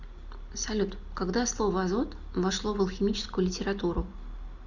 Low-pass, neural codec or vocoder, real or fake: 7.2 kHz; none; real